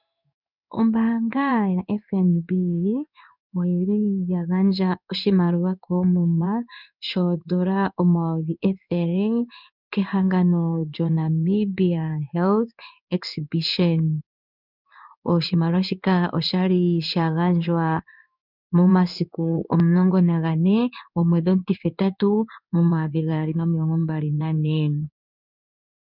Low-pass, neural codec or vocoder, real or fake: 5.4 kHz; codec, 16 kHz in and 24 kHz out, 1 kbps, XY-Tokenizer; fake